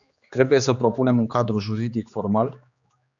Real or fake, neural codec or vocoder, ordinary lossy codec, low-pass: fake; codec, 16 kHz, 2 kbps, X-Codec, HuBERT features, trained on general audio; MP3, 96 kbps; 7.2 kHz